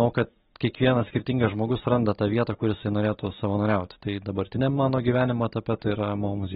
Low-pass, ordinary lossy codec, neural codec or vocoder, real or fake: 7.2 kHz; AAC, 16 kbps; none; real